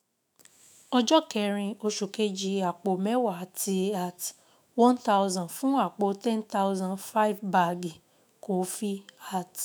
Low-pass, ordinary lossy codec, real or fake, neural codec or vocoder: none; none; fake; autoencoder, 48 kHz, 128 numbers a frame, DAC-VAE, trained on Japanese speech